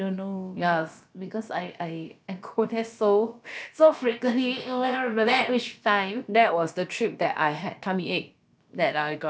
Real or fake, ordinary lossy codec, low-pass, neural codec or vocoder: fake; none; none; codec, 16 kHz, about 1 kbps, DyCAST, with the encoder's durations